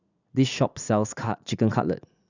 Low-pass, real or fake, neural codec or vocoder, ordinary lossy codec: 7.2 kHz; fake; vocoder, 44.1 kHz, 80 mel bands, Vocos; none